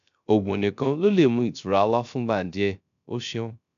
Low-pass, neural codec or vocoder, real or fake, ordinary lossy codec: 7.2 kHz; codec, 16 kHz, 0.3 kbps, FocalCodec; fake; none